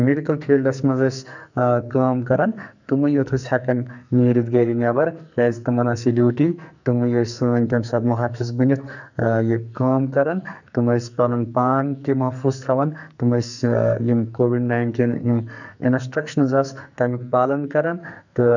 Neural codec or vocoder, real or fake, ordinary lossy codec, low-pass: codec, 44.1 kHz, 2.6 kbps, SNAC; fake; none; 7.2 kHz